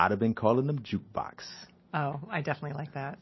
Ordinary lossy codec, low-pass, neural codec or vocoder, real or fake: MP3, 24 kbps; 7.2 kHz; none; real